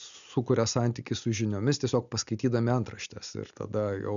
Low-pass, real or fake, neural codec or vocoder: 7.2 kHz; real; none